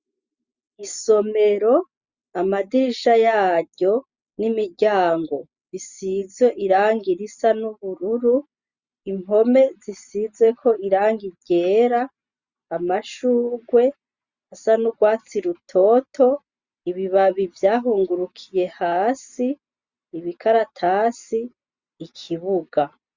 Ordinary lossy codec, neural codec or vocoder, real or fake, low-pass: Opus, 64 kbps; none; real; 7.2 kHz